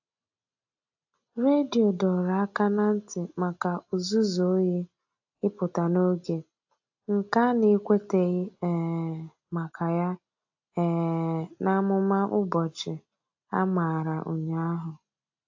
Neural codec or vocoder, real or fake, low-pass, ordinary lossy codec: none; real; 7.2 kHz; AAC, 48 kbps